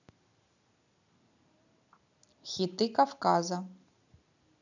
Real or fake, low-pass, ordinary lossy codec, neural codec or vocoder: real; 7.2 kHz; none; none